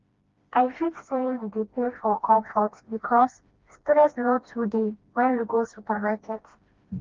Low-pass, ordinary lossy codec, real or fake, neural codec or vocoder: 7.2 kHz; Opus, 32 kbps; fake; codec, 16 kHz, 1 kbps, FreqCodec, smaller model